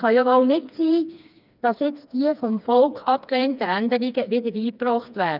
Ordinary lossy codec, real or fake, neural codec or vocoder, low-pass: none; fake; codec, 16 kHz, 2 kbps, FreqCodec, smaller model; 5.4 kHz